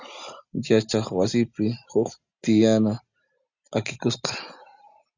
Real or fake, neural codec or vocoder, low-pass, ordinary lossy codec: real; none; 7.2 kHz; Opus, 64 kbps